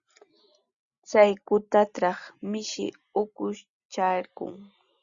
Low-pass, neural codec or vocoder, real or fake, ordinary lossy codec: 7.2 kHz; none; real; Opus, 64 kbps